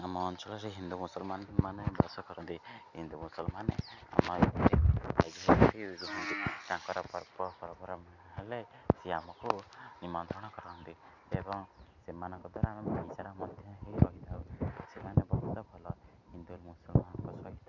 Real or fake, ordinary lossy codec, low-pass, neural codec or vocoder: real; none; 7.2 kHz; none